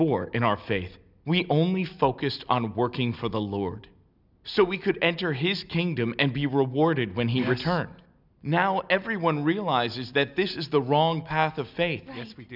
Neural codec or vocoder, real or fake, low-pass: none; real; 5.4 kHz